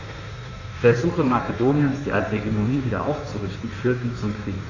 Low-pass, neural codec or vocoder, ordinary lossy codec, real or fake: 7.2 kHz; codec, 16 kHz in and 24 kHz out, 1.1 kbps, FireRedTTS-2 codec; none; fake